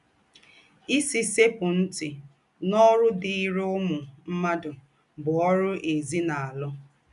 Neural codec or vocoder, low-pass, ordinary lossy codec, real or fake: none; 10.8 kHz; none; real